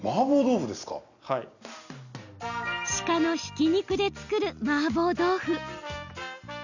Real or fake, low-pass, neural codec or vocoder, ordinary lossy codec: real; 7.2 kHz; none; none